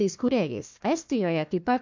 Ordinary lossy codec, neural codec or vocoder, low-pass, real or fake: MP3, 64 kbps; codec, 16 kHz, 1 kbps, FunCodec, trained on Chinese and English, 50 frames a second; 7.2 kHz; fake